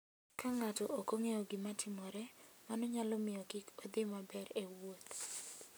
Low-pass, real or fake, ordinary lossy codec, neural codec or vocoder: none; real; none; none